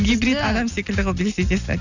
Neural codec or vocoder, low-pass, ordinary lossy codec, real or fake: none; 7.2 kHz; none; real